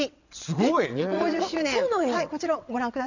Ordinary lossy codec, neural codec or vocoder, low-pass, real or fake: none; vocoder, 22.05 kHz, 80 mel bands, WaveNeXt; 7.2 kHz; fake